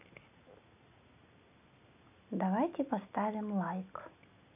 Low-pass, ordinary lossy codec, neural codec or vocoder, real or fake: 3.6 kHz; none; none; real